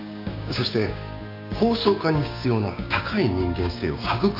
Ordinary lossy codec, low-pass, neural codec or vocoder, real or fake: AAC, 48 kbps; 5.4 kHz; codec, 16 kHz, 6 kbps, DAC; fake